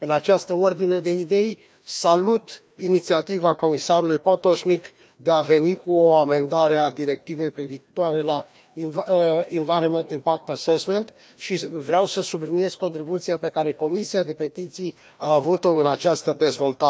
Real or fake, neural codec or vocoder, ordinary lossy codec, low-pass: fake; codec, 16 kHz, 1 kbps, FreqCodec, larger model; none; none